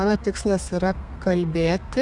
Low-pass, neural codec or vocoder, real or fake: 10.8 kHz; codec, 32 kHz, 1.9 kbps, SNAC; fake